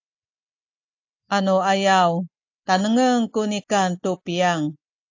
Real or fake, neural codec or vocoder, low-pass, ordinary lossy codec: real; none; 7.2 kHz; AAC, 48 kbps